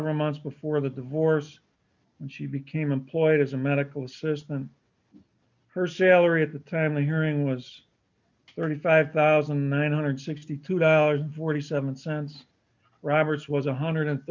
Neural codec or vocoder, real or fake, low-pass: none; real; 7.2 kHz